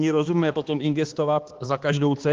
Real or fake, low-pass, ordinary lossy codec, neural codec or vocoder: fake; 7.2 kHz; Opus, 16 kbps; codec, 16 kHz, 2 kbps, X-Codec, HuBERT features, trained on balanced general audio